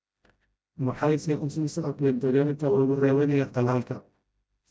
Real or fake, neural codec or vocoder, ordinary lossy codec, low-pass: fake; codec, 16 kHz, 0.5 kbps, FreqCodec, smaller model; none; none